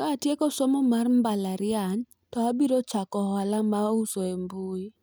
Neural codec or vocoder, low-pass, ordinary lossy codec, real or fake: none; none; none; real